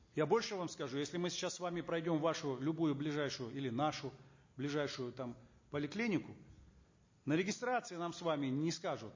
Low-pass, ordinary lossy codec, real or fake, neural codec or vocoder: 7.2 kHz; MP3, 32 kbps; real; none